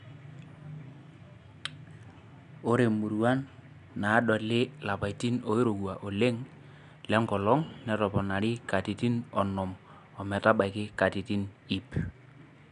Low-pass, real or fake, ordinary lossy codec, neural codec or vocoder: 10.8 kHz; real; none; none